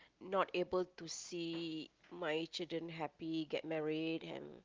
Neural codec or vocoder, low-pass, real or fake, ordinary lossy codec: none; 7.2 kHz; real; Opus, 32 kbps